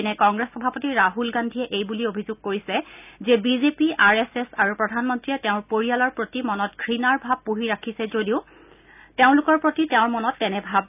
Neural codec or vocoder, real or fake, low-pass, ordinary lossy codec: none; real; 3.6 kHz; none